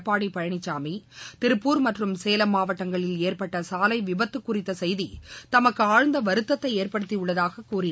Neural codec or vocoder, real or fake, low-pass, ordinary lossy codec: none; real; none; none